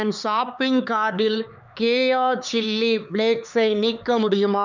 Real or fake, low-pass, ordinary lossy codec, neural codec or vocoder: fake; 7.2 kHz; none; codec, 16 kHz, 4 kbps, X-Codec, HuBERT features, trained on LibriSpeech